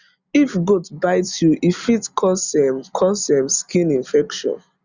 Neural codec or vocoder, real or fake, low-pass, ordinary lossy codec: none; real; 7.2 kHz; Opus, 64 kbps